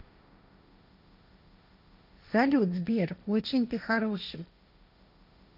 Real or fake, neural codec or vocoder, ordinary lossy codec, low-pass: fake; codec, 16 kHz, 1.1 kbps, Voila-Tokenizer; none; 5.4 kHz